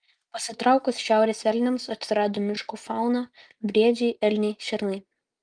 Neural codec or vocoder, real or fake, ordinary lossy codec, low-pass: none; real; Opus, 32 kbps; 9.9 kHz